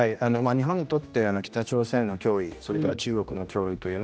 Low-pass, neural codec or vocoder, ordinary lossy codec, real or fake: none; codec, 16 kHz, 1 kbps, X-Codec, HuBERT features, trained on general audio; none; fake